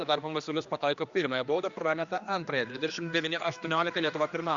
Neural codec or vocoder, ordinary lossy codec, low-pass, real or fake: codec, 16 kHz, 2 kbps, X-Codec, HuBERT features, trained on general audio; Opus, 64 kbps; 7.2 kHz; fake